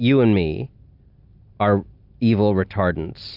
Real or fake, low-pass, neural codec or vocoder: real; 5.4 kHz; none